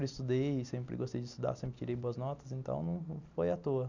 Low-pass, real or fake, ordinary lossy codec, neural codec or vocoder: 7.2 kHz; real; none; none